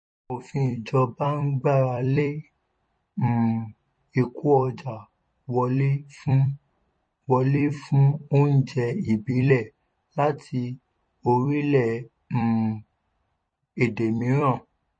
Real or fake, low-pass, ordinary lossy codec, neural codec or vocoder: fake; 9.9 kHz; MP3, 32 kbps; vocoder, 44.1 kHz, 128 mel bands every 256 samples, BigVGAN v2